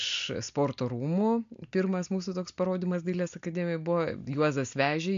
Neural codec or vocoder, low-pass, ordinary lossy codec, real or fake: none; 7.2 kHz; MP3, 64 kbps; real